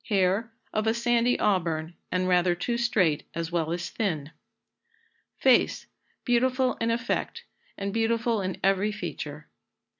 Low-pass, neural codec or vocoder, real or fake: 7.2 kHz; none; real